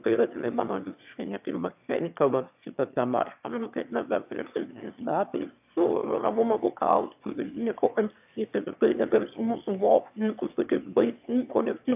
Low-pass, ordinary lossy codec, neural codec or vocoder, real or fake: 3.6 kHz; AAC, 32 kbps; autoencoder, 22.05 kHz, a latent of 192 numbers a frame, VITS, trained on one speaker; fake